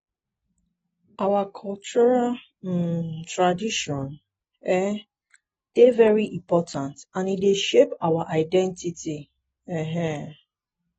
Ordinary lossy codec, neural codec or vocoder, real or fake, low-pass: AAC, 24 kbps; none; real; 19.8 kHz